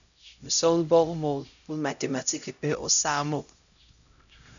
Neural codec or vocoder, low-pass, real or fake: codec, 16 kHz, 0.5 kbps, X-Codec, HuBERT features, trained on LibriSpeech; 7.2 kHz; fake